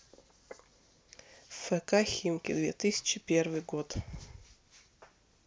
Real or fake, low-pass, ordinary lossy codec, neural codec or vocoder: real; none; none; none